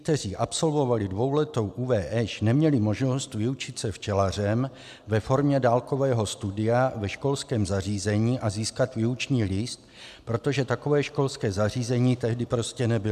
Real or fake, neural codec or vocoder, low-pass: real; none; 14.4 kHz